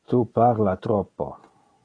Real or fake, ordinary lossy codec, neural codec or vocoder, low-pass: real; AAC, 32 kbps; none; 9.9 kHz